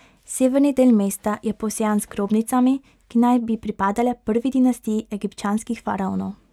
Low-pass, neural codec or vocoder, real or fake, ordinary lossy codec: 19.8 kHz; none; real; none